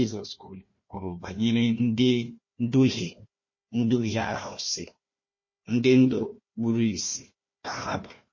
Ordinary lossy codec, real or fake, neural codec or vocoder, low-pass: MP3, 32 kbps; fake; codec, 16 kHz, 1 kbps, FunCodec, trained on Chinese and English, 50 frames a second; 7.2 kHz